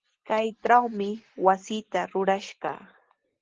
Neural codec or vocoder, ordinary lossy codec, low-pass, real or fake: none; Opus, 16 kbps; 7.2 kHz; real